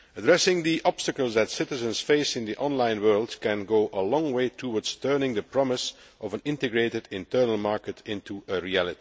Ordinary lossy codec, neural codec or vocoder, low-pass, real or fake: none; none; none; real